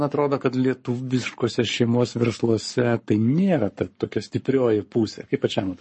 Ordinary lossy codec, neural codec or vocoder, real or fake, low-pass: MP3, 32 kbps; codec, 44.1 kHz, 7.8 kbps, Pupu-Codec; fake; 10.8 kHz